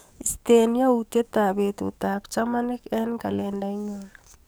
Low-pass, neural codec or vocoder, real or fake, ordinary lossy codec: none; codec, 44.1 kHz, 7.8 kbps, DAC; fake; none